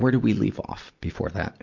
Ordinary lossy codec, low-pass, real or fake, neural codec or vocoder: MP3, 64 kbps; 7.2 kHz; real; none